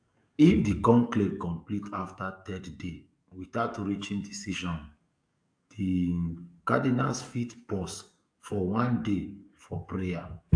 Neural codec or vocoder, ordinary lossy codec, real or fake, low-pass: codec, 44.1 kHz, 7.8 kbps, DAC; AAC, 64 kbps; fake; 9.9 kHz